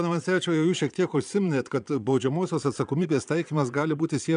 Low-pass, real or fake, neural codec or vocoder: 9.9 kHz; fake; vocoder, 22.05 kHz, 80 mel bands, Vocos